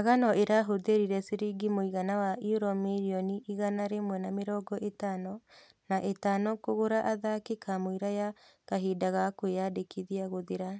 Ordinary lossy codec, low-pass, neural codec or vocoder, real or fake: none; none; none; real